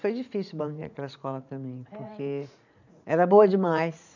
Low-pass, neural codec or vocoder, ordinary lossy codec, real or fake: 7.2 kHz; vocoder, 44.1 kHz, 80 mel bands, Vocos; none; fake